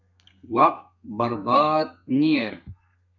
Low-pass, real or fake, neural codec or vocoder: 7.2 kHz; fake; codec, 32 kHz, 1.9 kbps, SNAC